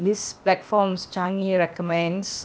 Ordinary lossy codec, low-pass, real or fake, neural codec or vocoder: none; none; fake; codec, 16 kHz, 0.8 kbps, ZipCodec